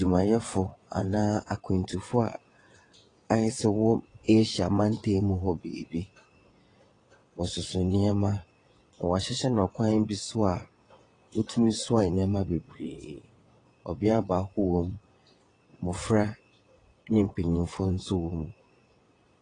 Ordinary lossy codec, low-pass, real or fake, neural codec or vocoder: AAC, 32 kbps; 9.9 kHz; fake; vocoder, 22.05 kHz, 80 mel bands, WaveNeXt